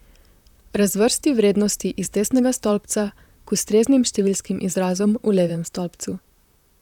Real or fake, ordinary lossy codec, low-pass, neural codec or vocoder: fake; none; 19.8 kHz; vocoder, 44.1 kHz, 128 mel bands, Pupu-Vocoder